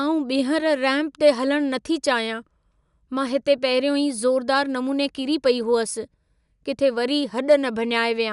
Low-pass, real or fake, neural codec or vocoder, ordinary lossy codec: 10.8 kHz; real; none; none